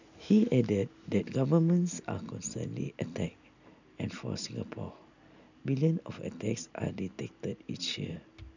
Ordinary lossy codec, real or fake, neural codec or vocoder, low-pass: none; real; none; 7.2 kHz